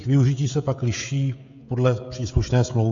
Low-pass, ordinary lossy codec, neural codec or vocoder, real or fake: 7.2 kHz; AAC, 64 kbps; codec, 16 kHz, 16 kbps, FreqCodec, smaller model; fake